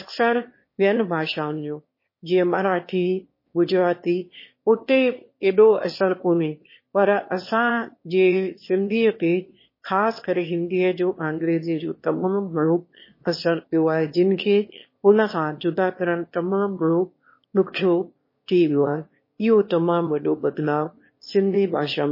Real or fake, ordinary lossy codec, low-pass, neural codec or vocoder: fake; MP3, 24 kbps; 5.4 kHz; autoencoder, 22.05 kHz, a latent of 192 numbers a frame, VITS, trained on one speaker